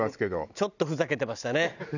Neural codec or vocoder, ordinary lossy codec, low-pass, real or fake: none; none; 7.2 kHz; real